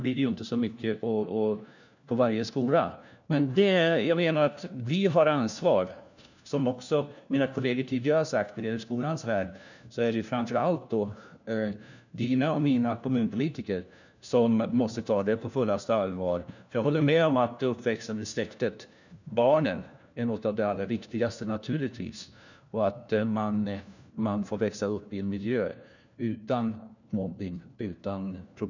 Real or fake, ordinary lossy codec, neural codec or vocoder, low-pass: fake; none; codec, 16 kHz, 1 kbps, FunCodec, trained on LibriTTS, 50 frames a second; 7.2 kHz